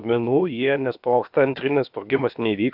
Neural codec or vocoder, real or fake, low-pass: codec, 16 kHz, about 1 kbps, DyCAST, with the encoder's durations; fake; 5.4 kHz